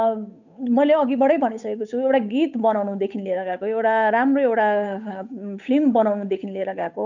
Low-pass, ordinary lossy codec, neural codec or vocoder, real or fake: 7.2 kHz; none; codec, 16 kHz, 8 kbps, FunCodec, trained on Chinese and English, 25 frames a second; fake